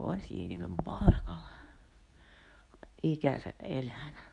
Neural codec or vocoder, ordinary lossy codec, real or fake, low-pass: codec, 24 kHz, 0.9 kbps, WavTokenizer, medium speech release version 2; none; fake; 10.8 kHz